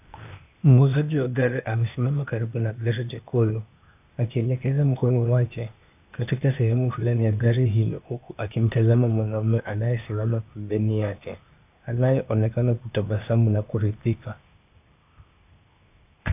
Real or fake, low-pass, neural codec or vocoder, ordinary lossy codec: fake; 3.6 kHz; codec, 16 kHz, 0.8 kbps, ZipCodec; AAC, 32 kbps